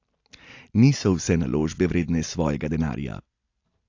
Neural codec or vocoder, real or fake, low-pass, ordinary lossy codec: none; real; 7.2 kHz; AAC, 48 kbps